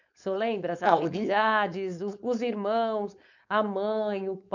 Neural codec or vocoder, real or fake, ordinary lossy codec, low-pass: codec, 16 kHz, 4.8 kbps, FACodec; fake; none; 7.2 kHz